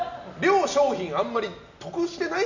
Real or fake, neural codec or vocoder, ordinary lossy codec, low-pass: real; none; none; 7.2 kHz